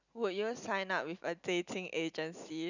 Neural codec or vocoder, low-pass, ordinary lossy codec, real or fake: none; 7.2 kHz; none; real